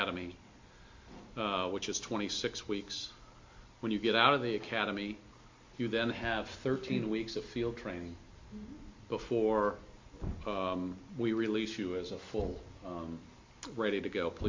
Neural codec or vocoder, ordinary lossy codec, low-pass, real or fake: none; MP3, 48 kbps; 7.2 kHz; real